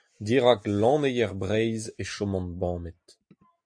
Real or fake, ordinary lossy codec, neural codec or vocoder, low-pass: real; AAC, 64 kbps; none; 9.9 kHz